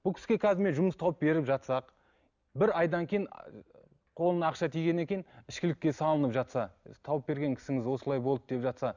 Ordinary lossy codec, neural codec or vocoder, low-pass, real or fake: none; none; 7.2 kHz; real